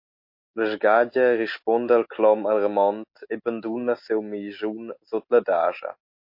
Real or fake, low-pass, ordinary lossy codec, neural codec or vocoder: real; 5.4 kHz; MP3, 32 kbps; none